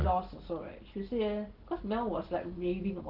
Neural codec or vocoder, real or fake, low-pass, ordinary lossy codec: none; real; 5.4 kHz; Opus, 16 kbps